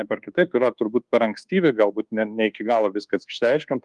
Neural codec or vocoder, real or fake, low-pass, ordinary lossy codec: codec, 24 kHz, 1.2 kbps, DualCodec; fake; 10.8 kHz; Opus, 24 kbps